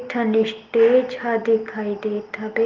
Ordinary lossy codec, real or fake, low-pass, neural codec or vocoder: Opus, 24 kbps; real; 7.2 kHz; none